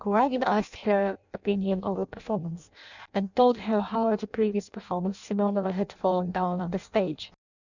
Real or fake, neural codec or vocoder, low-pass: fake; codec, 16 kHz in and 24 kHz out, 0.6 kbps, FireRedTTS-2 codec; 7.2 kHz